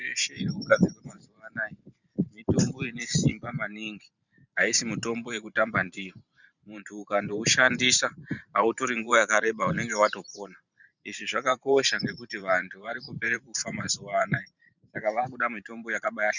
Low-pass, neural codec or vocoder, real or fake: 7.2 kHz; vocoder, 44.1 kHz, 128 mel bands every 512 samples, BigVGAN v2; fake